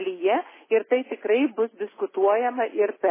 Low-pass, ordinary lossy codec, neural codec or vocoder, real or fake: 3.6 kHz; MP3, 16 kbps; none; real